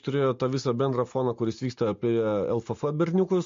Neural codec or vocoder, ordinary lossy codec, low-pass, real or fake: none; MP3, 48 kbps; 7.2 kHz; real